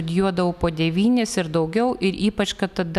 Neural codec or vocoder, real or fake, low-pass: none; real; 14.4 kHz